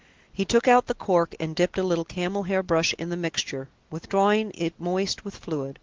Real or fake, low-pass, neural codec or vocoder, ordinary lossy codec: real; 7.2 kHz; none; Opus, 16 kbps